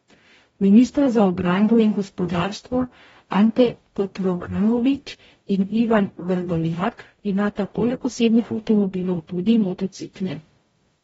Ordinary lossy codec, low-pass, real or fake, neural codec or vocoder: AAC, 24 kbps; 19.8 kHz; fake; codec, 44.1 kHz, 0.9 kbps, DAC